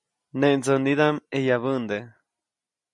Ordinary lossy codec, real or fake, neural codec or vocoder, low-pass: MP3, 64 kbps; real; none; 10.8 kHz